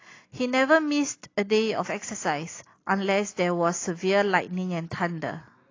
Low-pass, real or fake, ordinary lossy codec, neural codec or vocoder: 7.2 kHz; real; AAC, 32 kbps; none